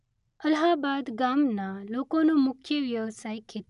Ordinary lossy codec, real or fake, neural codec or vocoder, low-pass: none; real; none; 10.8 kHz